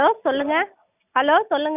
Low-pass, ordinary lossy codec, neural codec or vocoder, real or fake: 3.6 kHz; none; none; real